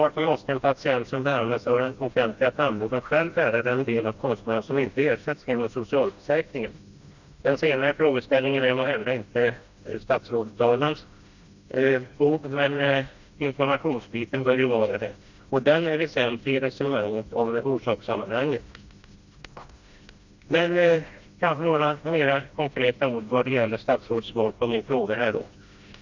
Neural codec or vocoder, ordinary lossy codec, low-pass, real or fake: codec, 16 kHz, 1 kbps, FreqCodec, smaller model; Opus, 64 kbps; 7.2 kHz; fake